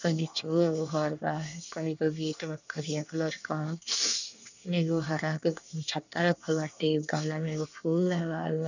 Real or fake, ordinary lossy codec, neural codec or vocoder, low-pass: fake; none; codec, 24 kHz, 1 kbps, SNAC; 7.2 kHz